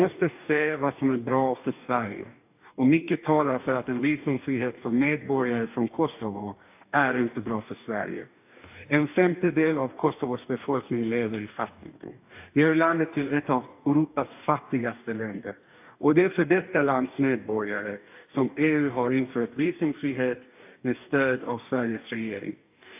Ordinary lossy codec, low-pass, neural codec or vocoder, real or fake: AAC, 32 kbps; 3.6 kHz; codec, 44.1 kHz, 2.6 kbps, DAC; fake